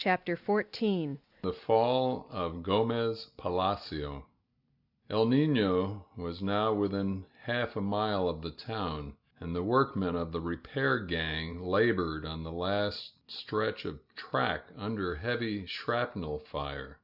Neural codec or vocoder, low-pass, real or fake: none; 5.4 kHz; real